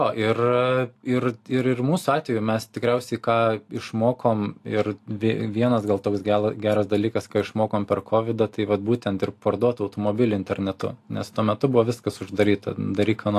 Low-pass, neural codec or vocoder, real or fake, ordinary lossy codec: 14.4 kHz; none; real; AAC, 64 kbps